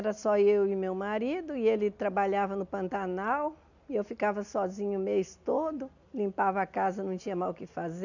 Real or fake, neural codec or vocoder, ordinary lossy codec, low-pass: real; none; none; 7.2 kHz